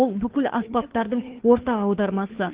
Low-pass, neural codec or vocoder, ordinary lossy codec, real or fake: 3.6 kHz; codec, 16 kHz, 2 kbps, FunCodec, trained on Chinese and English, 25 frames a second; Opus, 16 kbps; fake